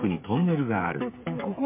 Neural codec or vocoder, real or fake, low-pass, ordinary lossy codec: codec, 16 kHz, 8 kbps, FreqCodec, smaller model; fake; 3.6 kHz; MP3, 24 kbps